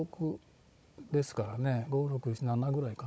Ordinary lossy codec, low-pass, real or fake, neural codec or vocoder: none; none; fake; codec, 16 kHz, 16 kbps, FunCodec, trained on Chinese and English, 50 frames a second